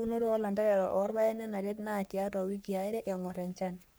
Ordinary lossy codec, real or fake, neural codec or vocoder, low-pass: none; fake; codec, 44.1 kHz, 3.4 kbps, Pupu-Codec; none